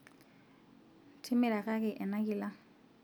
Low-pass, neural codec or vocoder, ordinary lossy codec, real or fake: none; none; none; real